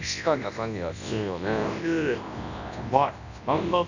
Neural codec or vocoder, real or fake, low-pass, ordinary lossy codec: codec, 24 kHz, 0.9 kbps, WavTokenizer, large speech release; fake; 7.2 kHz; none